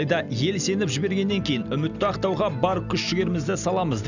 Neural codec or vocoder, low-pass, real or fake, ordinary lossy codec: none; 7.2 kHz; real; none